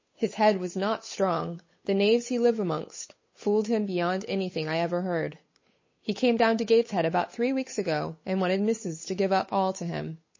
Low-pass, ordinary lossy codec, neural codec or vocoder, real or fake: 7.2 kHz; MP3, 32 kbps; codec, 16 kHz, 8 kbps, FunCodec, trained on Chinese and English, 25 frames a second; fake